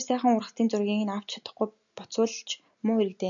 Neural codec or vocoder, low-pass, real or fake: none; 7.2 kHz; real